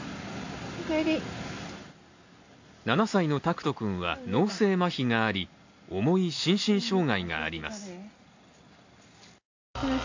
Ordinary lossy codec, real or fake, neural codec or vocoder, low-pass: AAC, 48 kbps; real; none; 7.2 kHz